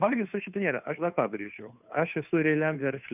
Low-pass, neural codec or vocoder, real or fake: 3.6 kHz; codec, 16 kHz, 2 kbps, FunCodec, trained on Chinese and English, 25 frames a second; fake